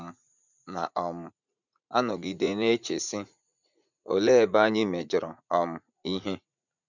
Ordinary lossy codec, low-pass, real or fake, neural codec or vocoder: none; 7.2 kHz; fake; vocoder, 44.1 kHz, 80 mel bands, Vocos